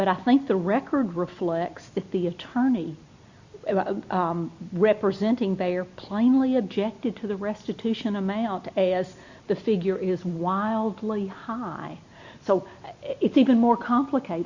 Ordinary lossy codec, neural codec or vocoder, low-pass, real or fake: Opus, 64 kbps; none; 7.2 kHz; real